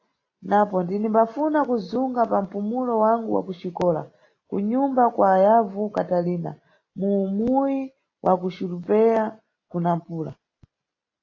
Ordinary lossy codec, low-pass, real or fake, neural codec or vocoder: AAC, 32 kbps; 7.2 kHz; real; none